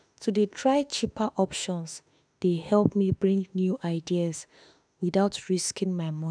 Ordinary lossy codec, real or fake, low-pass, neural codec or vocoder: none; fake; 9.9 kHz; autoencoder, 48 kHz, 32 numbers a frame, DAC-VAE, trained on Japanese speech